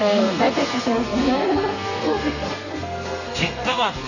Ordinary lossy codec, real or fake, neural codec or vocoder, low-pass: none; fake; codec, 32 kHz, 1.9 kbps, SNAC; 7.2 kHz